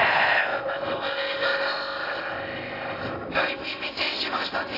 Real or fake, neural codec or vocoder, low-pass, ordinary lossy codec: fake; codec, 16 kHz in and 24 kHz out, 0.6 kbps, FocalCodec, streaming, 4096 codes; 5.4 kHz; AAC, 24 kbps